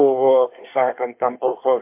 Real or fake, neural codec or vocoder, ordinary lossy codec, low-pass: fake; codec, 24 kHz, 1 kbps, SNAC; MP3, 32 kbps; 3.6 kHz